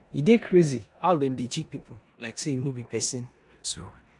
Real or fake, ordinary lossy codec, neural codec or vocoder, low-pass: fake; none; codec, 16 kHz in and 24 kHz out, 0.9 kbps, LongCat-Audio-Codec, four codebook decoder; 10.8 kHz